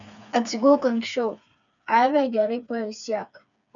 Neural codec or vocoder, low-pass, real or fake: codec, 16 kHz, 4 kbps, FreqCodec, smaller model; 7.2 kHz; fake